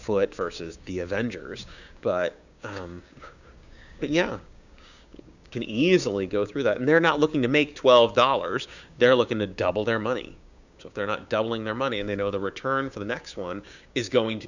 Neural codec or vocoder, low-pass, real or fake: codec, 16 kHz, 6 kbps, DAC; 7.2 kHz; fake